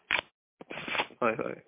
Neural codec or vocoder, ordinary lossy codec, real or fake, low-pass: none; MP3, 32 kbps; real; 3.6 kHz